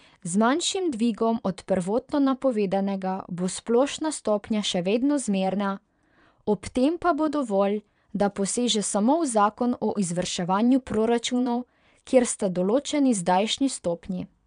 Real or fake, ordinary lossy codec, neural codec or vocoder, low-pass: fake; none; vocoder, 22.05 kHz, 80 mel bands, Vocos; 9.9 kHz